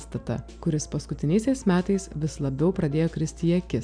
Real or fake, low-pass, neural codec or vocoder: real; 9.9 kHz; none